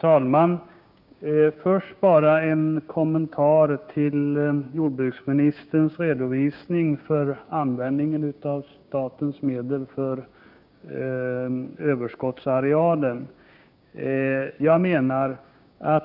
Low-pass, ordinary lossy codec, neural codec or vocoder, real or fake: 5.4 kHz; none; codec, 16 kHz, 6 kbps, DAC; fake